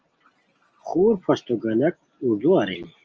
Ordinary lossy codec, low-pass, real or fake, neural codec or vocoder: Opus, 24 kbps; 7.2 kHz; real; none